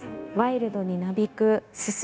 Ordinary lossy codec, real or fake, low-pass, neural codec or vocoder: none; fake; none; codec, 16 kHz, 0.9 kbps, LongCat-Audio-Codec